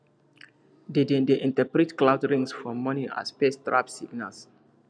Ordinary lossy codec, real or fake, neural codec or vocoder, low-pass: none; real; none; 9.9 kHz